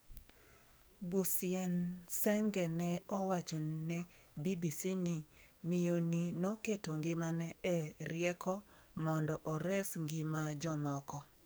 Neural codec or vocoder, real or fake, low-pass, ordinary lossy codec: codec, 44.1 kHz, 2.6 kbps, SNAC; fake; none; none